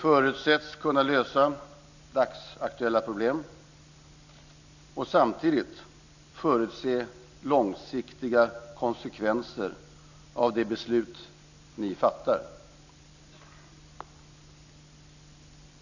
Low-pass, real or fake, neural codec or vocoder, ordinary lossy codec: 7.2 kHz; real; none; none